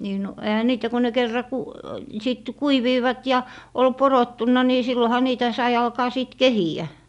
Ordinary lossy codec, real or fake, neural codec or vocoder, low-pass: none; real; none; 10.8 kHz